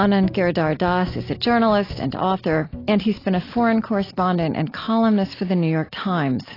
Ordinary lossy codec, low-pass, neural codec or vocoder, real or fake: AAC, 24 kbps; 5.4 kHz; none; real